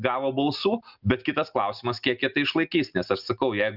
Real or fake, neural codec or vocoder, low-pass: real; none; 5.4 kHz